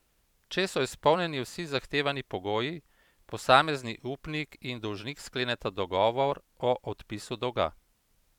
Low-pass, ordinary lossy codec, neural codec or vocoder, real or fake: 19.8 kHz; none; vocoder, 44.1 kHz, 128 mel bands every 512 samples, BigVGAN v2; fake